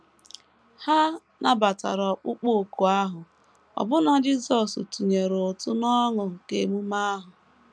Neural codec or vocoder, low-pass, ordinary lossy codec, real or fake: none; none; none; real